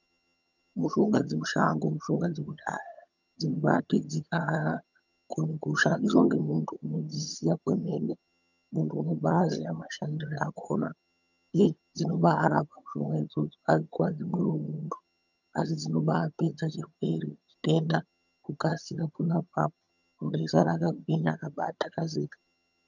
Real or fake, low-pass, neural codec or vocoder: fake; 7.2 kHz; vocoder, 22.05 kHz, 80 mel bands, HiFi-GAN